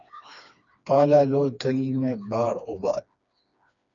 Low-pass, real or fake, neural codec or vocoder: 7.2 kHz; fake; codec, 16 kHz, 2 kbps, FreqCodec, smaller model